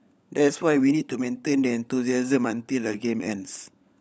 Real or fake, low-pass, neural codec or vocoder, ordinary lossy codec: fake; none; codec, 16 kHz, 16 kbps, FunCodec, trained on LibriTTS, 50 frames a second; none